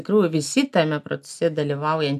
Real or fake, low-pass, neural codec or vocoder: real; 14.4 kHz; none